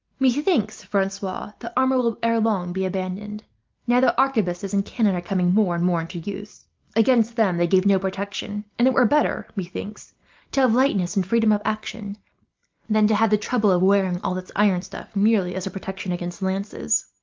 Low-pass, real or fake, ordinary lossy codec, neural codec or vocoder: 7.2 kHz; real; Opus, 24 kbps; none